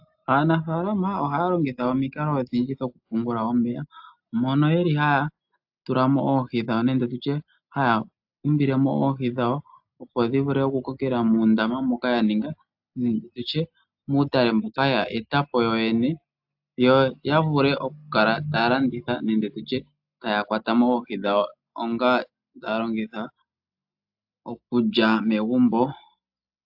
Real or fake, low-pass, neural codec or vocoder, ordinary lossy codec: real; 5.4 kHz; none; AAC, 48 kbps